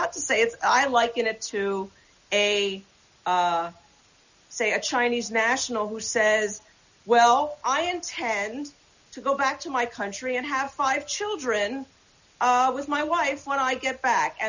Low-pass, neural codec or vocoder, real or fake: 7.2 kHz; none; real